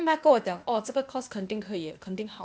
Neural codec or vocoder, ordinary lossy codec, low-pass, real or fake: codec, 16 kHz, 0.8 kbps, ZipCodec; none; none; fake